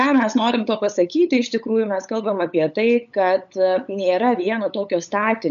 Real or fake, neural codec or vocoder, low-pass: fake; codec, 16 kHz, 8 kbps, FunCodec, trained on LibriTTS, 25 frames a second; 7.2 kHz